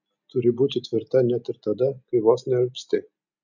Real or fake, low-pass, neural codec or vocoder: real; 7.2 kHz; none